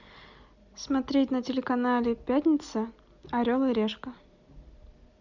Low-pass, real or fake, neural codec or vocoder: 7.2 kHz; real; none